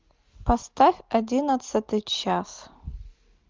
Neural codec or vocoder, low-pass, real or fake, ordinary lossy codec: none; 7.2 kHz; real; Opus, 24 kbps